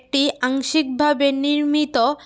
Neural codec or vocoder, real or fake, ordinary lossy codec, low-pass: none; real; none; none